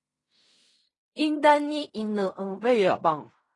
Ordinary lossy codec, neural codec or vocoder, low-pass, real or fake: AAC, 32 kbps; codec, 16 kHz in and 24 kHz out, 0.4 kbps, LongCat-Audio-Codec, fine tuned four codebook decoder; 10.8 kHz; fake